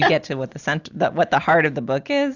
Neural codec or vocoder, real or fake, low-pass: none; real; 7.2 kHz